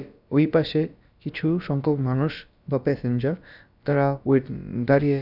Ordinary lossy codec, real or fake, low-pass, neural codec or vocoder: none; fake; 5.4 kHz; codec, 16 kHz, about 1 kbps, DyCAST, with the encoder's durations